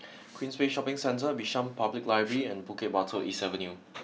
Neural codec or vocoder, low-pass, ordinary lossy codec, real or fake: none; none; none; real